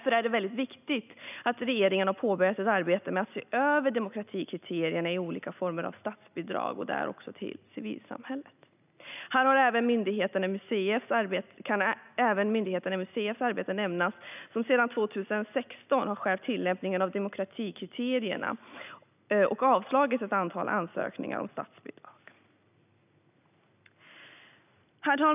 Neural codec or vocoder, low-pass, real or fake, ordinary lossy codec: none; 3.6 kHz; real; none